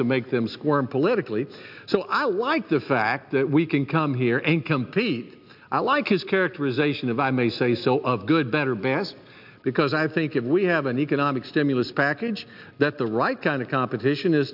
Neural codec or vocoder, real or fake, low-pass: none; real; 5.4 kHz